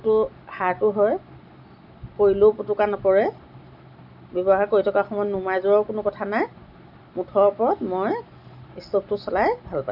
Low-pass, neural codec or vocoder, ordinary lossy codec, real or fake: 5.4 kHz; none; none; real